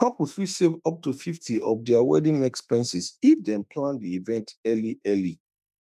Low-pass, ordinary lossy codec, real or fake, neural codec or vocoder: 14.4 kHz; none; fake; autoencoder, 48 kHz, 32 numbers a frame, DAC-VAE, trained on Japanese speech